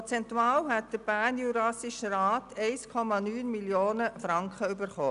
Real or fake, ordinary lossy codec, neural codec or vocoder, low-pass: real; none; none; 10.8 kHz